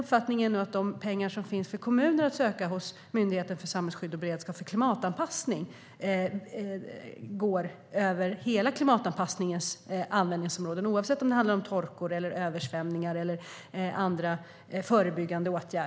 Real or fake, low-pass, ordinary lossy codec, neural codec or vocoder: real; none; none; none